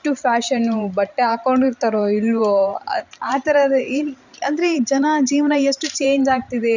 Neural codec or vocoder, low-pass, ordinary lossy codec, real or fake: vocoder, 44.1 kHz, 128 mel bands every 512 samples, BigVGAN v2; 7.2 kHz; none; fake